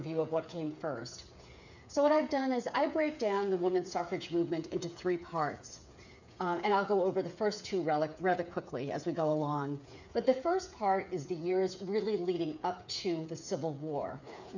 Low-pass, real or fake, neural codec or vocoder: 7.2 kHz; fake; codec, 16 kHz, 8 kbps, FreqCodec, smaller model